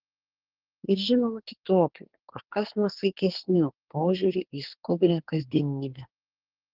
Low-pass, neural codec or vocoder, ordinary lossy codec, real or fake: 5.4 kHz; codec, 24 kHz, 1 kbps, SNAC; Opus, 16 kbps; fake